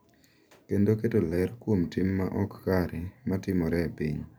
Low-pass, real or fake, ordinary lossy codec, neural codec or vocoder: none; fake; none; vocoder, 44.1 kHz, 128 mel bands every 512 samples, BigVGAN v2